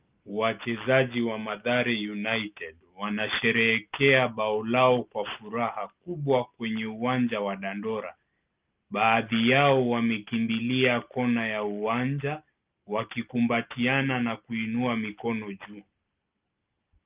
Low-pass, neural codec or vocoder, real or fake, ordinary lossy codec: 3.6 kHz; none; real; Opus, 32 kbps